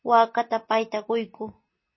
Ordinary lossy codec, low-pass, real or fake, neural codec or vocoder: MP3, 24 kbps; 7.2 kHz; real; none